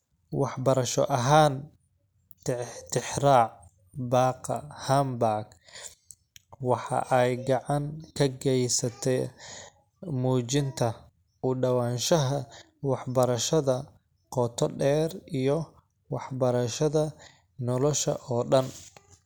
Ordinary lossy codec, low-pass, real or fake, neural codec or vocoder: none; none; real; none